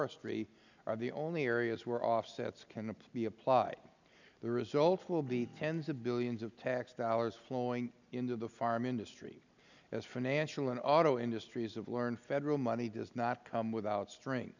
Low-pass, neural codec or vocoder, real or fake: 7.2 kHz; none; real